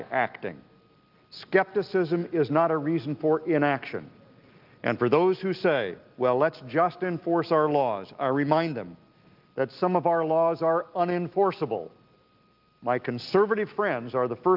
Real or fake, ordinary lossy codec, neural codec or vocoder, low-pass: real; Opus, 24 kbps; none; 5.4 kHz